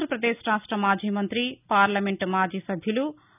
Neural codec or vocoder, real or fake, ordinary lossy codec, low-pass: none; real; none; 3.6 kHz